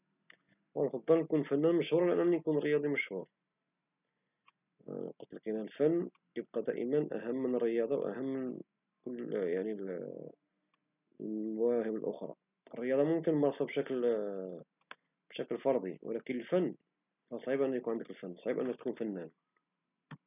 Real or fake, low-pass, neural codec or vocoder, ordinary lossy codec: real; 3.6 kHz; none; none